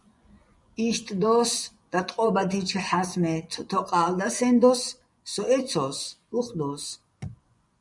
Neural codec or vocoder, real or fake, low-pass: vocoder, 24 kHz, 100 mel bands, Vocos; fake; 10.8 kHz